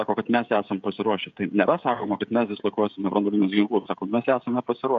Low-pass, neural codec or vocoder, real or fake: 7.2 kHz; codec, 16 kHz, 16 kbps, FreqCodec, smaller model; fake